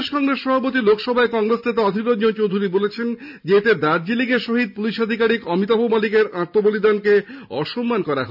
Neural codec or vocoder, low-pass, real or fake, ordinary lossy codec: none; 5.4 kHz; real; none